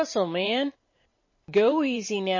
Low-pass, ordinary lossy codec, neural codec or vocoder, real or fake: 7.2 kHz; MP3, 32 kbps; vocoder, 44.1 kHz, 128 mel bands every 512 samples, BigVGAN v2; fake